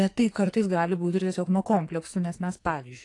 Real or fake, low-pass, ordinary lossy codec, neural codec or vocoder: fake; 10.8 kHz; AAC, 48 kbps; codec, 44.1 kHz, 2.6 kbps, SNAC